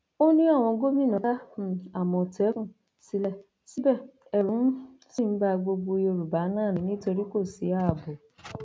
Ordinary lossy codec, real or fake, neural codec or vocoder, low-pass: none; real; none; none